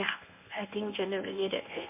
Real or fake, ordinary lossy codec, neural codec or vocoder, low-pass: fake; MP3, 24 kbps; codec, 24 kHz, 0.9 kbps, WavTokenizer, medium speech release version 1; 3.6 kHz